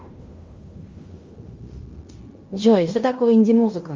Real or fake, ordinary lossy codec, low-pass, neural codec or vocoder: fake; Opus, 32 kbps; 7.2 kHz; codec, 16 kHz in and 24 kHz out, 0.9 kbps, LongCat-Audio-Codec, fine tuned four codebook decoder